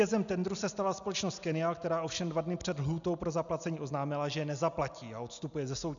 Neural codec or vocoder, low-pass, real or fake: none; 7.2 kHz; real